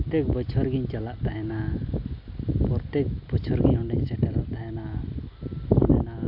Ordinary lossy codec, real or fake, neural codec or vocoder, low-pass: AAC, 48 kbps; real; none; 5.4 kHz